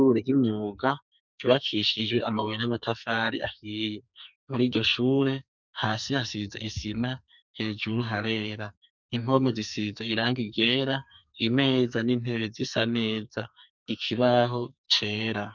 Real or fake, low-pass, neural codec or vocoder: fake; 7.2 kHz; codec, 44.1 kHz, 2.6 kbps, SNAC